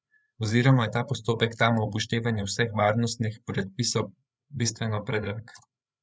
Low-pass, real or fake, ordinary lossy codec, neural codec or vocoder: none; fake; none; codec, 16 kHz, 8 kbps, FreqCodec, larger model